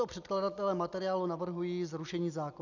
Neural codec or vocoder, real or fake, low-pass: none; real; 7.2 kHz